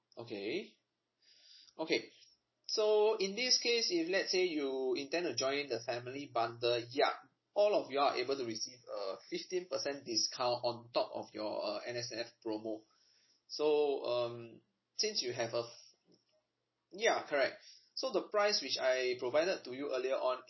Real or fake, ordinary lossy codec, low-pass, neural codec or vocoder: real; MP3, 24 kbps; 7.2 kHz; none